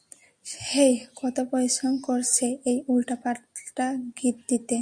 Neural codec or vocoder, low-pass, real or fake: none; 9.9 kHz; real